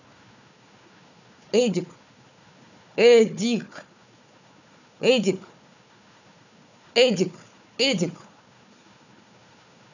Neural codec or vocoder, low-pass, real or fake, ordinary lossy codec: codec, 16 kHz, 4 kbps, FunCodec, trained on Chinese and English, 50 frames a second; 7.2 kHz; fake; none